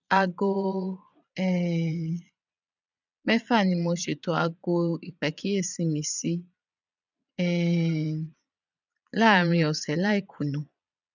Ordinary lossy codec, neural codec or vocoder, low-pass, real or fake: none; vocoder, 22.05 kHz, 80 mel bands, Vocos; 7.2 kHz; fake